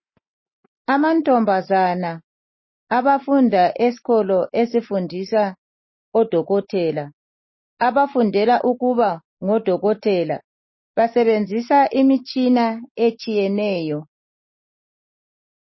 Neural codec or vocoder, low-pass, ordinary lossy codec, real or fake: none; 7.2 kHz; MP3, 24 kbps; real